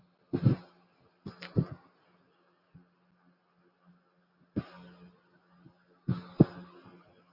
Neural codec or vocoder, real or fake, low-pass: none; real; 5.4 kHz